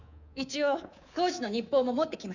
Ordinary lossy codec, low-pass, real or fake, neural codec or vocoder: none; 7.2 kHz; fake; codec, 24 kHz, 3.1 kbps, DualCodec